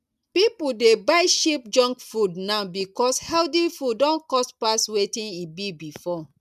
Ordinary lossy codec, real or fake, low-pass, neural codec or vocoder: none; real; 14.4 kHz; none